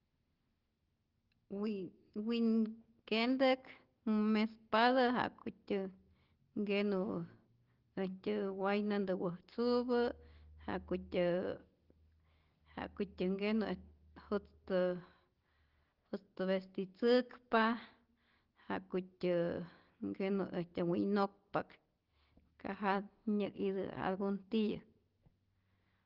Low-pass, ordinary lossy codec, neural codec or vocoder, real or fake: 5.4 kHz; Opus, 16 kbps; none; real